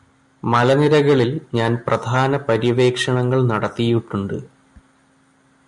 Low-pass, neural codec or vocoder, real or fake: 10.8 kHz; none; real